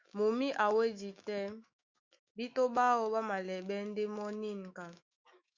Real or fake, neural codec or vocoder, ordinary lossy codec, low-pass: fake; autoencoder, 48 kHz, 128 numbers a frame, DAC-VAE, trained on Japanese speech; Opus, 64 kbps; 7.2 kHz